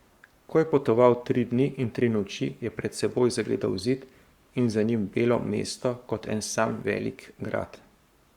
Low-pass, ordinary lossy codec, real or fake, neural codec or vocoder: 19.8 kHz; Opus, 64 kbps; fake; codec, 44.1 kHz, 7.8 kbps, Pupu-Codec